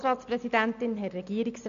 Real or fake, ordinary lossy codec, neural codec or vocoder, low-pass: real; MP3, 64 kbps; none; 7.2 kHz